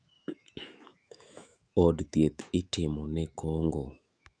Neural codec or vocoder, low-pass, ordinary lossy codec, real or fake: autoencoder, 48 kHz, 128 numbers a frame, DAC-VAE, trained on Japanese speech; 9.9 kHz; Opus, 64 kbps; fake